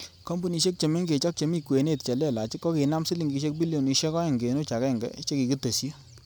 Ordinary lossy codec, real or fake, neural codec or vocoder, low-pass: none; real; none; none